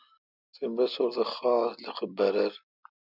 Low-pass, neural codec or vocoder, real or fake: 5.4 kHz; none; real